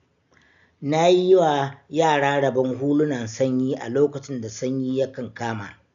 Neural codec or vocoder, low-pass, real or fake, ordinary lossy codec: none; 7.2 kHz; real; none